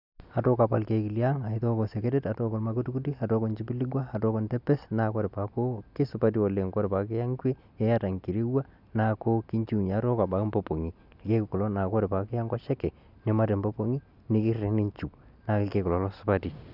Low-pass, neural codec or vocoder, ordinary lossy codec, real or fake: 5.4 kHz; none; none; real